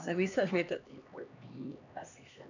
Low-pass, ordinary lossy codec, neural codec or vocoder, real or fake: 7.2 kHz; none; codec, 16 kHz, 2 kbps, X-Codec, HuBERT features, trained on LibriSpeech; fake